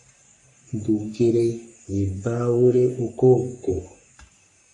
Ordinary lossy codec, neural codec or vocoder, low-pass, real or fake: MP3, 48 kbps; codec, 44.1 kHz, 3.4 kbps, Pupu-Codec; 10.8 kHz; fake